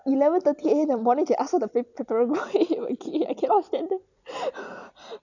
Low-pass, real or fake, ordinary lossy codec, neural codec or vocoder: 7.2 kHz; real; none; none